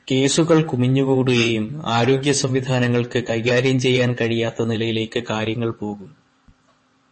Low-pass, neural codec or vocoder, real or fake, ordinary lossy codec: 9.9 kHz; vocoder, 22.05 kHz, 80 mel bands, WaveNeXt; fake; MP3, 32 kbps